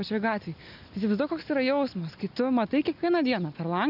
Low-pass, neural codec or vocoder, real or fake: 5.4 kHz; none; real